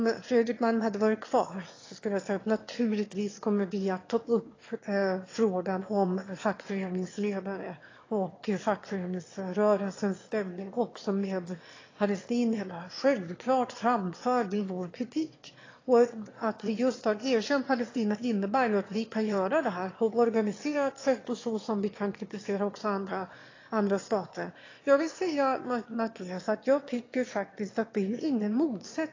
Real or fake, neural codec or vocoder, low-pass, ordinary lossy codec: fake; autoencoder, 22.05 kHz, a latent of 192 numbers a frame, VITS, trained on one speaker; 7.2 kHz; AAC, 32 kbps